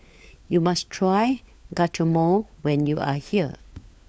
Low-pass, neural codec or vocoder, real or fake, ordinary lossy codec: none; codec, 16 kHz, 4 kbps, FunCodec, trained on LibriTTS, 50 frames a second; fake; none